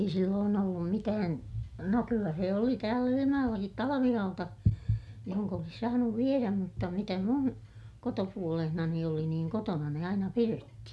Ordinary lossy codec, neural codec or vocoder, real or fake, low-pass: none; none; real; none